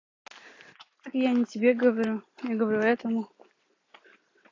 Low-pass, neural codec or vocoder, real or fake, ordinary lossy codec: 7.2 kHz; none; real; AAC, 32 kbps